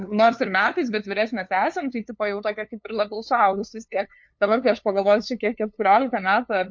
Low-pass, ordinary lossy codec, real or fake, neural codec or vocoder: 7.2 kHz; MP3, 48 kbps; fake; codec, 16 kHz, 2 kbps, FunCodec, trained on LibriTTS, 25 frames a second